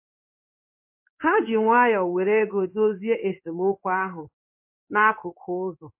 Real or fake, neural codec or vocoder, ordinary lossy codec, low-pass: fake; codec, 16 kHz in and 24 kHz out, 1 kbps, XY-Tokenizer; MP3, 24 kbps; 3.6 kHz